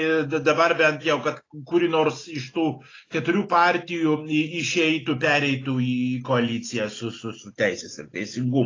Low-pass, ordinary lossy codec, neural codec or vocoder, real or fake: 7.2 kHz; AAC, 32 kbps; none; real